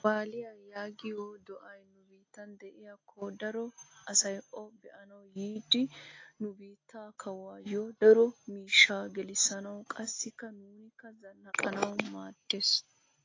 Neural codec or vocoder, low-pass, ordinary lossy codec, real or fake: none; 7.2 kHz; AAC, 32 kbps; real